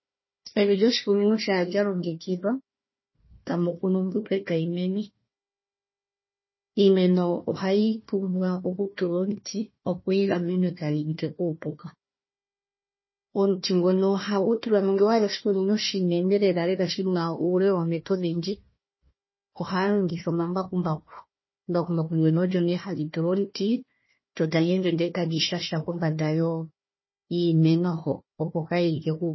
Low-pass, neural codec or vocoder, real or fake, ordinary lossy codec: 7.2 kHz; codec, 16 kHz, 1 kbps, FunCodec, trained on Chinese and English, 50 frames a second; fake; MP3, 24 kbps